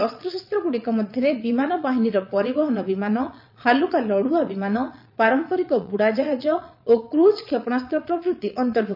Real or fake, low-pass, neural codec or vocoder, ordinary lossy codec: fake; 5.4 kHz; vocoder, 22.05 kHz, 80 mel bands, Vocos; none